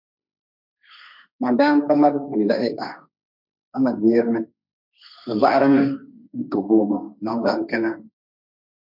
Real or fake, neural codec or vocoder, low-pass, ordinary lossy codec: fake; codec, 16 kHz, 1.1 kbps, Voila-Tokenizer; 5.4 kHz; MP3, 48 kbps